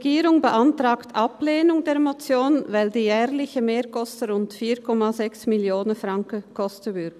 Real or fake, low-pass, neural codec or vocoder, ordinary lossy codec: real; 14.4 kHz; none; none